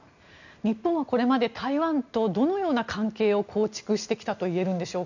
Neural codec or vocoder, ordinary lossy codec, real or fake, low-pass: none; none; real; 7.2 kHz